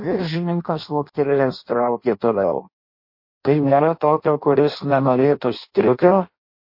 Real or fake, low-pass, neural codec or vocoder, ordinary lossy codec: fake; 5.4 kHz; codec, 16 kHz in and 24 kHz out, 0.6 kbps, FireRedTTS-2 codec; MP3, 32 kbps